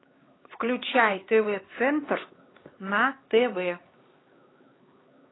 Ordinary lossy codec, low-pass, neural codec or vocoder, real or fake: AAC, 16 kbps; 7.2 kHz; codec, 16 kHz, 4 kbps, X-Codec, HuBERT features, trained on LibriSpeech; fake